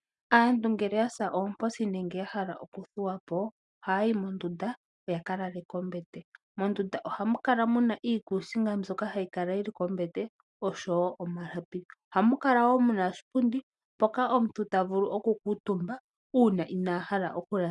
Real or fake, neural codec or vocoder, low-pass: real; none; 10.8 kHz